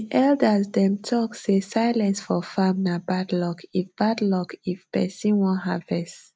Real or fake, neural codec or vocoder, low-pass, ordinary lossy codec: real; none; none; none